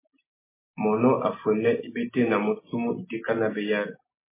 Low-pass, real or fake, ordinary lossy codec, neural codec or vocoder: 3.6 kHz; real; MP3, 16 kbps; none